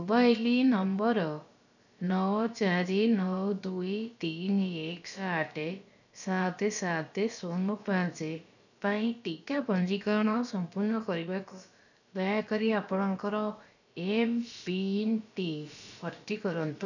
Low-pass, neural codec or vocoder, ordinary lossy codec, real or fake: 7.2 kHz; codec, 16 kHz, about 1 kbps, DyCAST, with the encoder's durations; none; fake